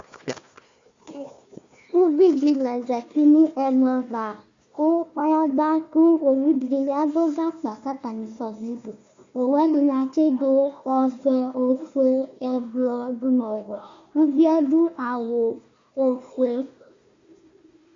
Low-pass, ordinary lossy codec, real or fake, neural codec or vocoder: 7.2 kHz; Opus, 64 kbps; fake; codec, 16 kHz, 1 kbps, FunCodec, trained on Chinese and English, 50 frames a second